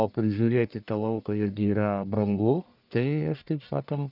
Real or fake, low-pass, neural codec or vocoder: fake; 5.4 kHz; codec, 44.1 kHz, 1.7 kbps, Pupu-Codec